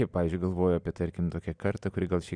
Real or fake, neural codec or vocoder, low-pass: real; none; 9.9 kHz